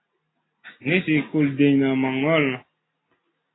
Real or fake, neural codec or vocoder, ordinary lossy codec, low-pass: real; none; AAC, 16 kbps; 7.2 kHz